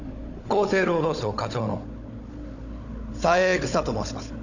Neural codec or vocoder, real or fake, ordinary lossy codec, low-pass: codec, 16 kHz, 16 kbps, FunCodec, trained on Chinese and English, 50 frames a second; fake; none; 7.2 kHz